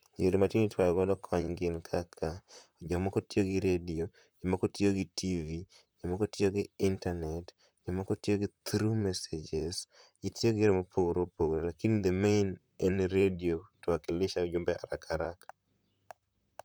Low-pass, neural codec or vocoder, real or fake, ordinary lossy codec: none; vocoder, 44.1 kHz, 128 mel bands, Pupu-Vocoder; fake; none